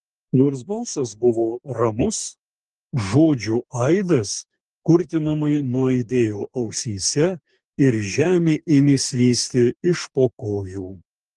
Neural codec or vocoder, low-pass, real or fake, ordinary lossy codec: codec, 44.1 kHz, 2.6 kbps, DAC; 10.8 kHz; fake; Opus, 24 kbps